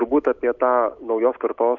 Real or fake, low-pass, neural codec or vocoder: real; 7.2 kHz; none